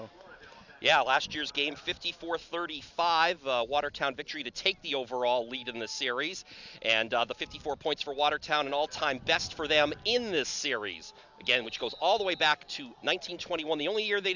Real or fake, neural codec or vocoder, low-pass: real; none; 7.2 kHz